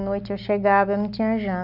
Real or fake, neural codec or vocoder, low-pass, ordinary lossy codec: real; none; 5.4 kHz; none